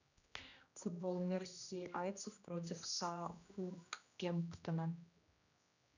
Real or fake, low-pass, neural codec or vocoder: fake; 7.2 kHz; codec, 16 kHz, 1 kbps, X-Codec, HuBERT features, trained on general audio